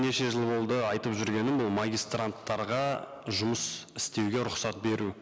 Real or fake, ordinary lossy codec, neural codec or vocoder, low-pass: real; none; none; none